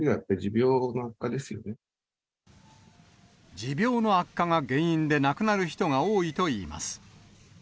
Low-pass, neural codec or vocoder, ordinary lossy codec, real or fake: none; none; none; real